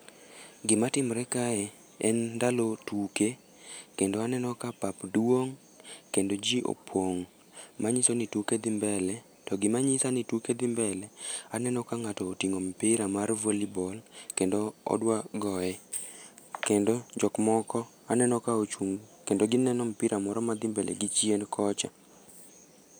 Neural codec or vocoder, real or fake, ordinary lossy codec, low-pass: none; real; none; none